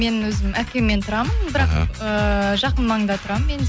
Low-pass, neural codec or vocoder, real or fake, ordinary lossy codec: none; none; real; none